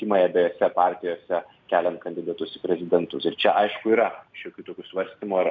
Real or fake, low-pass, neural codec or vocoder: real; 7.2 kHz; none